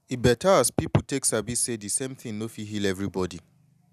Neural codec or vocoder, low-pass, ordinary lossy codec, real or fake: none; 14.4 kHz; none; real